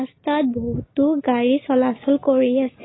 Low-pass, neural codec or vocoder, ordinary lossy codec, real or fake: 7.2 kHz; none; AAC, 16 kbps; real